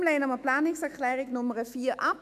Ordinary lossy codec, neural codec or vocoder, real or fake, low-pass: none; autoencoder, 48 kHz, 128 numbers a frame, DAC-VAE, trained on Japanese speech; fake; 14.4 kHz